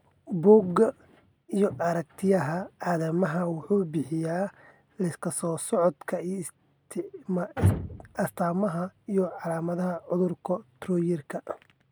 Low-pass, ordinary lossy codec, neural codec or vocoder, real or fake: none; none; none; real